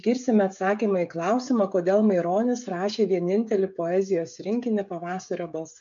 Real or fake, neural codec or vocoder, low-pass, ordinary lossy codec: fake; codec, 16 kHz, 16 kbps, FreqCodec, smaller model; 7.2 kHz; MP3, 64 kbps